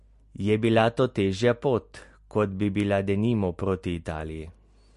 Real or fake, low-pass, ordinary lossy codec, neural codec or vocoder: real; 14.4 kHz; MP3, 48 kbps; none